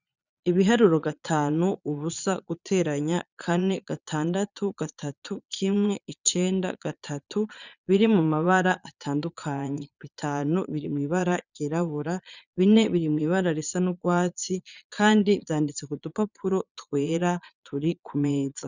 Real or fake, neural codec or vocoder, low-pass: fake; vocoder, 22.05 kHz, 80 mel bands, Vocos; 7.2 kHz